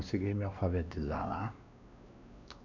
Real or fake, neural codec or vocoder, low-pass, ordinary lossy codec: fake; codec, 16 kHz, 2 kbps, X-Codec, WavLM features, trained on Multilingual LibriSpeech; 7.2 kHz; none